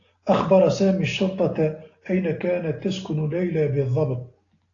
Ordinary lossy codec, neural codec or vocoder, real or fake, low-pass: AAC, 32 kbps; none; real; 7.2 kHz